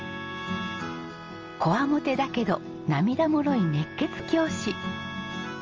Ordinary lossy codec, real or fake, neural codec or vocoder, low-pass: Opus, 24 kbps; real; none; 7.2 kHz